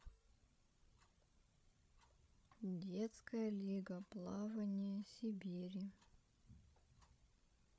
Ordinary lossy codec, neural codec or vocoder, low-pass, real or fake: none; codec, 16 kHz, 16 kbps, FreqCodec, larger model; none; fake